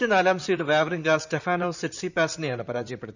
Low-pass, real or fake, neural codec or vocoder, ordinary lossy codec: 7.2 kHz; fake; vocoder, 44.1 kHz, 128 mel bands, Pupu-Vocoder; none